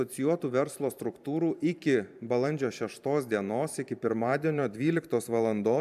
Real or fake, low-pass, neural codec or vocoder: fake; 14.4 kHz; vocoder, 44.1 kHz, 128 mel bands every 512 samples, BigVGAN v2